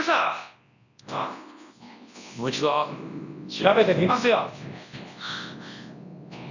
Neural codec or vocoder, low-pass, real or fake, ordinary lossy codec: codec, 24 kHz, 0.9 kbps, WavTokenizer, large speech release; 7.2 kHz; fake; none